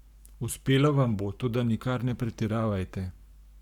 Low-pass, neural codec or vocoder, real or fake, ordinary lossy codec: 19.8 kHz; codec, 44.1 kHz, 7.8 kbps, Pupu-Codec; fake; none